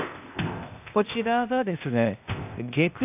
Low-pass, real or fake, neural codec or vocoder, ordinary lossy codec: 3.6 kHz; fake; codec, 16 kHz, 0.8 kbps, ZipCodec; AAC, 32 kbps